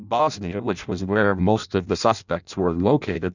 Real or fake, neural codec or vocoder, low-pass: fake; codec, 16 kHz in and 24 kHz out, 0.6 kbps, FireRedTTS-2 codec; 7.2 kHz